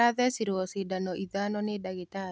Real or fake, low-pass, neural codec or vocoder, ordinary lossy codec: real; none; none; none